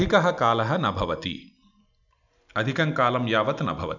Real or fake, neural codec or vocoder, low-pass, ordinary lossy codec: real; none; 7.2 kHz; none